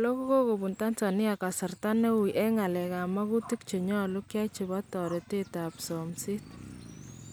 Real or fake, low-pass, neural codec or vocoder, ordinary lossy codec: real; none; none; none